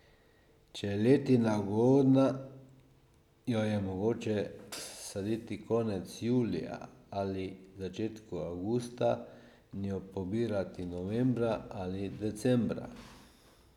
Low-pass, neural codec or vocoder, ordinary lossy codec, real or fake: 19.8 kHz; none; none; real